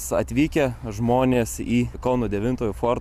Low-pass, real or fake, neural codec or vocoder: 14.4 kHz; real; none